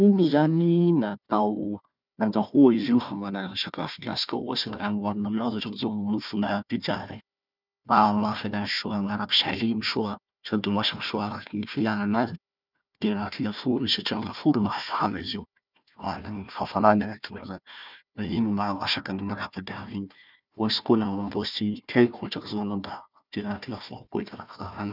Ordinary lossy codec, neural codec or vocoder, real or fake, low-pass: none; codec, 16 kHz, 1 kbps, FunCodec, trained on Chinese and English, 50 frames a second; fake; 5.4 kHz